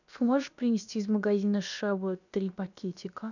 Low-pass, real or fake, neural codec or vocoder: 7.2 kHz; fake; codec, 16 kHz, about 1 kbps, DyCAST, with the encoder's durations